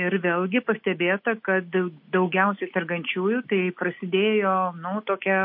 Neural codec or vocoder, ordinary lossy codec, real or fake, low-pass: none; MP3, 32 kbps; real; 5.4 kHz